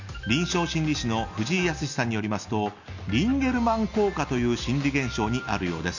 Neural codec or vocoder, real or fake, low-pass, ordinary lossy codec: none; real; 7.2 kHz; none